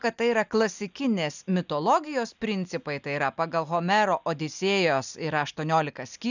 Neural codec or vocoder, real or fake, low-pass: none; real; 7.2 kHz